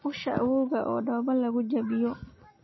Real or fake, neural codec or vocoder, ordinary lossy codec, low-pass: real; none; MP3, 24 kbps; 7.2 kHz